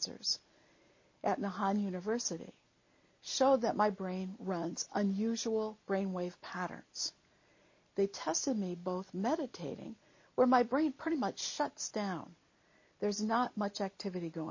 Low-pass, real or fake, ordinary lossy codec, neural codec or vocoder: 7.2 kHz; real; MP3, 32 kbps; none